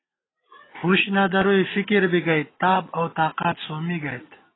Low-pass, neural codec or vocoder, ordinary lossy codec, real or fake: 7.2 kHz; none; AAC, 16 kbps; real